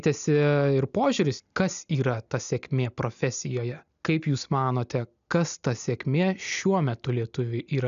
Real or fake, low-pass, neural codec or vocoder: real; 7.2 kHz; none